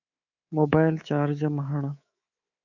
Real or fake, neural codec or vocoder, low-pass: fake; codec, 24 kHz, 3.1 kbps, DualCodec; 7.2 kHz